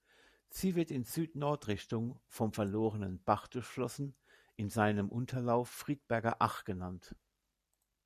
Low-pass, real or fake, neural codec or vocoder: 14.4 kHz; real; none